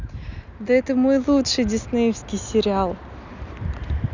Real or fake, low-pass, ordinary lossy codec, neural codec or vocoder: real; 7.2 kHz; none; none